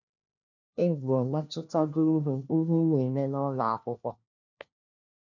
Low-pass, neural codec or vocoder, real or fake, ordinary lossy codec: 7.2 kHz; codec, 16 kHz, 1 kbps, FunCodec, trained on LibriTTS, 50 frames a second; fake; none